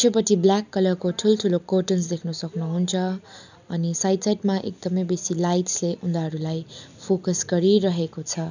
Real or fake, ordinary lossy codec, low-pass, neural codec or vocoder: real; none; 7.2 kHz; none